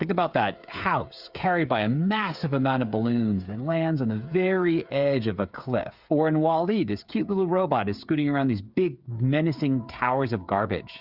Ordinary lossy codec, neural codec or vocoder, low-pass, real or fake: Opus, 64 kbps; codec, 16 kHz, 8 kbps, FreqCodec, smaller model; 5.4 kHz; fake